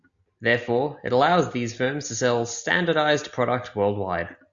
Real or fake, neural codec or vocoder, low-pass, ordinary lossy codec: real; none; 7.2 kHz; Opus, 64 kbps